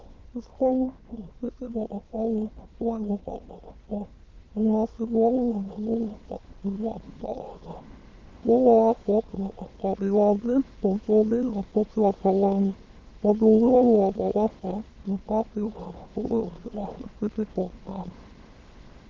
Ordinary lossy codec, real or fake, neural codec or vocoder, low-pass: Opus, 16 kbps; fake; autoencoder, 22.05 kHz, a latent of 192 numbers a frame, VITS, trained on many speakers; 7.2 kHz